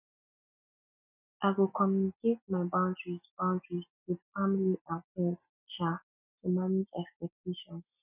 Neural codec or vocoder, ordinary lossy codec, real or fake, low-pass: none; none; real; 3.6 kHz